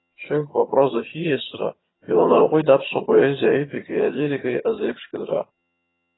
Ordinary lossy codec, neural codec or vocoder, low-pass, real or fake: AAC, 16 kbps; vocoder, 22.05 kHz, 80 mel bands, HiFi-GAN; 7.2 kHz; fake